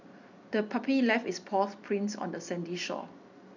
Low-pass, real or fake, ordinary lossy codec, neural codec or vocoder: 7.2 kHz; real; none; none